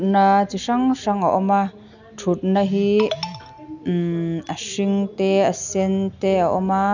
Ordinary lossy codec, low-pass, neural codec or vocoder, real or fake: none; 7.2 kHz; none; real